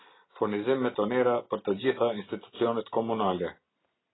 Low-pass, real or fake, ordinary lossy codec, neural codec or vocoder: 7.2 kHz; real; AAC, 16 kbps; none